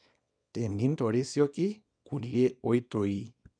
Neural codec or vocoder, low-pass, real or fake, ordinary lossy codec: codec, 24 kHz, 0.9 kbps, WavTokenizer, small release; 9.9 kHz; fake; none